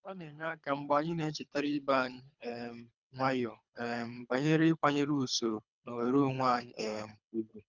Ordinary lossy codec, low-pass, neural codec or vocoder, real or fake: none; 7.2 kHz; codec, 24 kHz, 3 kbps, HILCodec; fake